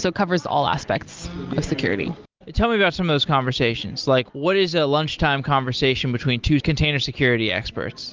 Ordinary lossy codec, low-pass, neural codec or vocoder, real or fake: Opus, 32 kbps; 7.2 kHz; none; real